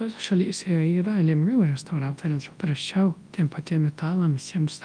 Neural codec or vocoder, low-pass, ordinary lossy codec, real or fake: codec, 24 kHz, 0.9 kbps, WavTokenizer, large speech release; 9.9 kHz; Opus, 32 kbps; fake